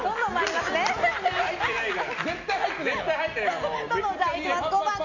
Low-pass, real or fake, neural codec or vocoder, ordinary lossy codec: 7.2 kHz; real; none; none